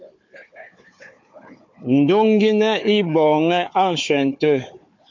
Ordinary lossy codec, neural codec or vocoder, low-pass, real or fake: MP3, 48 kbps; codec, 16 kHz, 4 kbps, FunCodec, trained on Chinese and English, 50 frames a second; 7.2 kHz; fake